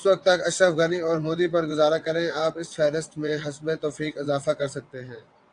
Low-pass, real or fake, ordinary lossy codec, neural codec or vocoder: 9.9 kHz; fake; AAC, 64 kbps; vocoder, 22.05 kHz, 80 mel bands, WaveNeXt